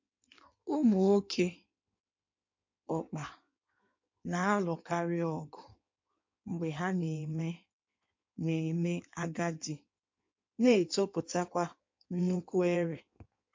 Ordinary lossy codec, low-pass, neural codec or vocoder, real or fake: MP3, 48 kbps; 7.2 kHz; codec, 16 kHz in and 24 kHz out, 1.1 kbps, FireRedTTS-2 codec; fake